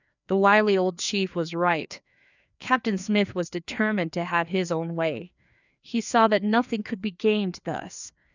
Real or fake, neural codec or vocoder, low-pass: fake; codec, 16 kHz, 2 kbps, FreqCodec, larger model; 7.2 kHz